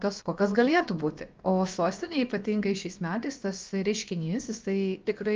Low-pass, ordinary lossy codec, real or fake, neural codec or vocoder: 7.2 kHz; Opus, 24 kbps; fake; codec, 16 kHz, about 1 kbps, DyCAST, with the encoder's durations